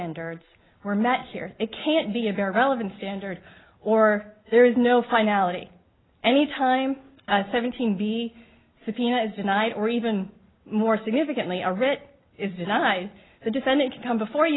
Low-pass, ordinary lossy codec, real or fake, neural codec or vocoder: 7.2 kHz; AAC, 16 kbps; real; none